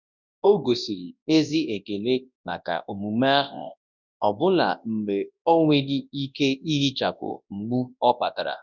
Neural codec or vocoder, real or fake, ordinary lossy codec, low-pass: codec, 24 kHz, 0.9 kbps, WavTokenizer, large speech release; fake; none; 7.2 kHz